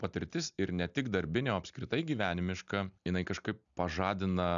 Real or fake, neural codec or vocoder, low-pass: real; none; 7.2 kHz